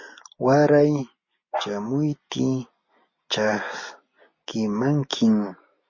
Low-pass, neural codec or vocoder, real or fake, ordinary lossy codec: 7.2 kHz; none; real; MP3, 32 kbps